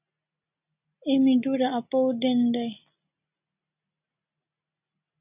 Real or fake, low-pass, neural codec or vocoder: real; 3.6 kHz; none